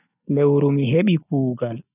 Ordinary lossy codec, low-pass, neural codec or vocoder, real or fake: none; 3.6 kHz; none; real